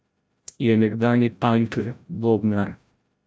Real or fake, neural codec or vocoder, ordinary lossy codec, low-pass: fake; codec, 16 kHz, 0.5 kbps, FreqCodec, larger model; none; none